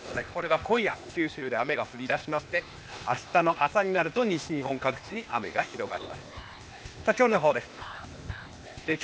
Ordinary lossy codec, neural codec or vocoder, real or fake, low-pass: none; codec, 16 kHz, 0.8 kbps, ZipCodec; fake; none